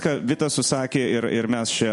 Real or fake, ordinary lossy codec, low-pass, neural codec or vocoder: real; MP3, 48 kbps; 14.4 kHz; none